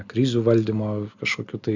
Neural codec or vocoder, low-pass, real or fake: none; 7.2 kHz; real